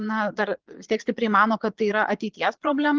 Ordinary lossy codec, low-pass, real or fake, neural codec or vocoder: Opus, 16 kbps; 7.2 kHz; fake; vocoder, 22.05 kHz, 80 mel bands, Vocos